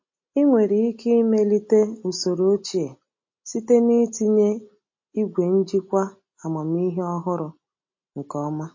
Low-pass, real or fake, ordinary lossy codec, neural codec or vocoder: 7.2 kHz; real; MP3, 32 kbps; none